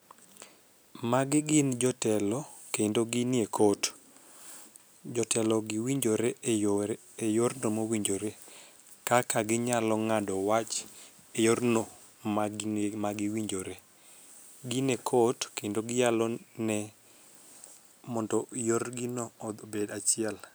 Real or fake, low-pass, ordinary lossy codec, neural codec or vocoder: real; none; none; none